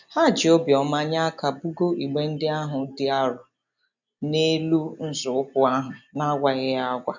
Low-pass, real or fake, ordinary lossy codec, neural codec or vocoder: 7.2 kHz; real; none; none